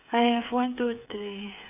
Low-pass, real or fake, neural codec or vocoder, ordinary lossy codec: 3.6 kHz; fake; codec, 16 kHz, 8 kbps, FreqCodec, smaller model; none